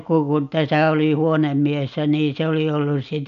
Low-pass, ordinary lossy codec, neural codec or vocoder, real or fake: 7.2 kHz; none; none; real